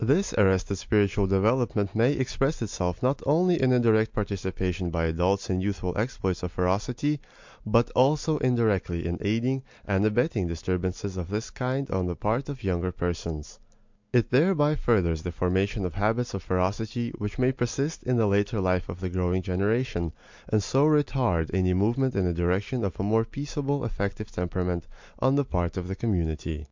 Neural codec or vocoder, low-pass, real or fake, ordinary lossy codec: none; 7.2 kHz; real; MP3, 64 kbps